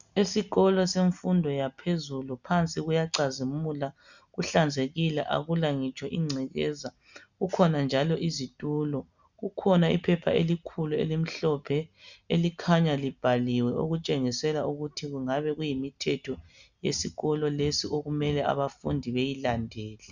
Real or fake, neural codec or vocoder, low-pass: real; none; 7.2 kHz